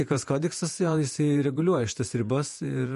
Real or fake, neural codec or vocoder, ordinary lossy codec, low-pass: fake; vocoder, 48 kHz, 128 mel bands, Vocos; MP3, 48 kbps; 14.4 kHz